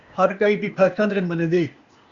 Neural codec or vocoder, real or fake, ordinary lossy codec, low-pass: codec, 16 kHz, 0.8 kbps, ZipCodec; fake; Opus, 64 kbps; 7.2 kHz